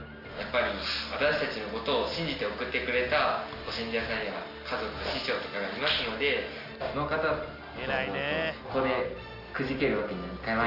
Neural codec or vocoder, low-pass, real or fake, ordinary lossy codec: none; 5.4 kHz; real; Opus, 64 kbps